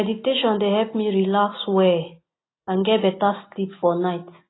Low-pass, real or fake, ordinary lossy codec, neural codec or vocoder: 7.2 kHz; real; AAC, 16 kbps; none